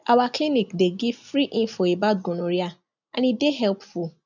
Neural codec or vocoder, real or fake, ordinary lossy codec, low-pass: none; real; none; 7.2 kHz